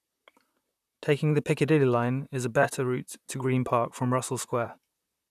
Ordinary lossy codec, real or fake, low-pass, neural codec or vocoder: none; fake; 14.4 kHz; vocoder, 44.1 kHz, 128 mel bands, Pupu-Vocoder